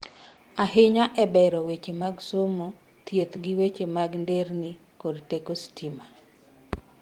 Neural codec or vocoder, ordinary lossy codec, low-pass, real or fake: vocoder, 44.1 kHz, 128 mel bands every 256 samples, BigVGAN v2; Opus, 24 kbps; 19.8 kHz; fake